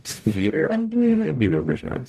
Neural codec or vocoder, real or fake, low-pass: codec, 44.1 kHz, 0.9 kbps, DAC; fake; 14.4 kHz